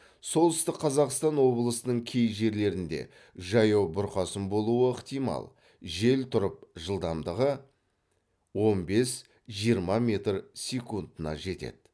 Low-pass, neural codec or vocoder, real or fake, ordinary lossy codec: none; none; real; none